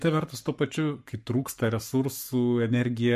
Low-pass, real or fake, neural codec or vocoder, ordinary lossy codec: 14.4 kHz; fake; codec, 44.1 kHz, 7.8 kbps, Pupu-Codec; MP3, 64 kbps